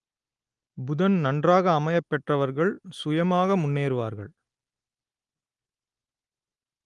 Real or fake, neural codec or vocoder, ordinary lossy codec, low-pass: real; none; Opus, 24 kbps; 10.8 kHz